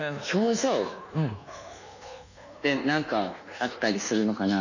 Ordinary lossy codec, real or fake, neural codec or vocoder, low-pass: none; fake; codec, 24 kHz, 1.2 kbps, DualCodec; 7.2 kHz